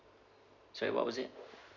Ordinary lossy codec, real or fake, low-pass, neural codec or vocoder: none; real; 7.2 kHz; none